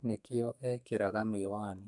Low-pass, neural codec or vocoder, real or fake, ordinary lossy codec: 10.8 kHz; codec, 32 kHz, 1.9 kbps, SNAC; fake; none